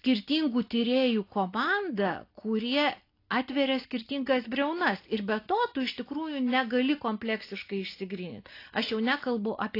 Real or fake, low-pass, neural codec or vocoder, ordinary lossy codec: real; 5.4 kHz; none; AAC, 32 kbps